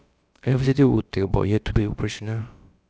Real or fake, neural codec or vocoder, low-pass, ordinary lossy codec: fake; codec, 16 kHz, about 1 kbps, DyCAST, with the encoder's durations; none; none